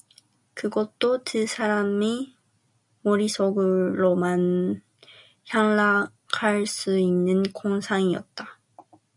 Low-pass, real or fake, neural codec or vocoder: 10.8 kHz; real; none